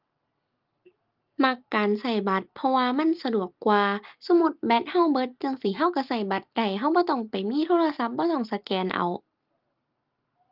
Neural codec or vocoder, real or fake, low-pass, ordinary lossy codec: none; real; 5.4 kHz; Opus, 24 kbps